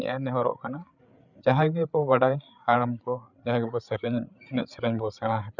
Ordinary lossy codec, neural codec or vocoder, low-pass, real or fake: none; codec, 16 kHz, 8 kbps, FreqCodec, larger model; 7.2 kHz; fake